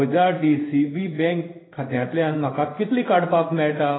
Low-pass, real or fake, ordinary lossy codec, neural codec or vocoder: 7.2 kHz; fake; AAC, 16 kbps; codec, 16 kHz in and 24 kHz out, 1 kbps, XY-Tokenizer